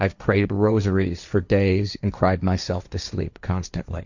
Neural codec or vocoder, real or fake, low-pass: codec, 16 kHz, 1.1 kbps, Voila-Tokenizer; fake; 7.2 kHz